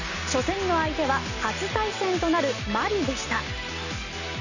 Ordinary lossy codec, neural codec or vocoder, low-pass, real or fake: none; none; 7.2 kHz; real